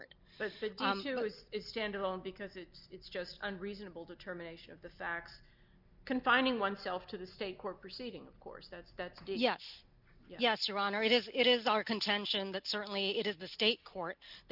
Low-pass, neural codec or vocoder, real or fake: 5.4 kHz; none; real